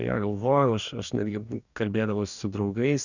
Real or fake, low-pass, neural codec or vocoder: fake; 7.2 kHz; codec, 44.1 kHz, 2.6 kbps, DAC